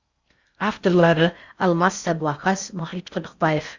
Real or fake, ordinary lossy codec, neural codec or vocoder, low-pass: fake; Opus, 64 kbps; codec, 16 kHz in and 24 kHz out, 0.6 kbps, FocalCodec, streaming, 4096 codes; 7.2 kHz